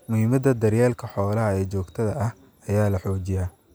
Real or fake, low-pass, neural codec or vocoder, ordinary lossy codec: real; none; none; none